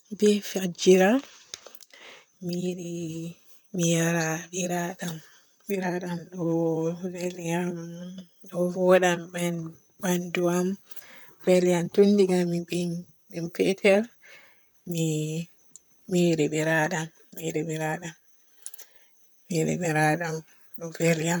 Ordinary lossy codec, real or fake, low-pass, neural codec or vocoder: none; fake; none; vocoder, 44.1 kHz, 128 mel bands, Pupu-Vocoder